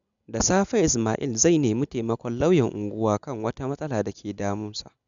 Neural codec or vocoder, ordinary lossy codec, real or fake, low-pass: none; none; real; 7.2 kHz